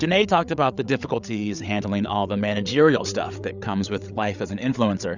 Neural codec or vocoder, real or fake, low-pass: codec, 16 kHz, 8 kbps, FreqCodec, larger model; fake; 7.2 kHz